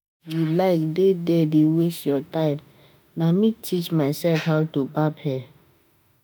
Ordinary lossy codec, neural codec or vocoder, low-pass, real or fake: none; autoencoder, 48 kHz, 32 numbers a frame, DAC-VAE, trained on Japanese speech; none; fake